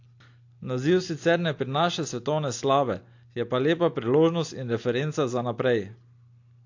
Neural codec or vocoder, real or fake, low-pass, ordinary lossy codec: none; real; 7.2 kHz; AAC, 48 kbps